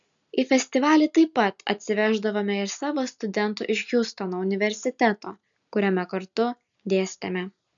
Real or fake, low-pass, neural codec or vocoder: real; 7.2 kHz; none